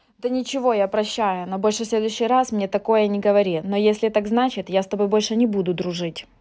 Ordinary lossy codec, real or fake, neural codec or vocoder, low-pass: none; real; none; none